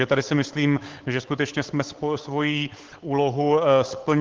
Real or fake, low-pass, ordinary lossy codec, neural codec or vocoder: fake; 7.2 kHz; Opus, 16 kbps; codec, 16 kHz, 8 kbps, FunCodec, trained on Chinese and English, 25 frames a second